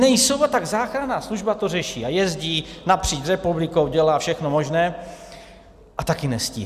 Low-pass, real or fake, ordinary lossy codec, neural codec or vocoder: 14.4 kHz; real; Opus, 64 kbps; none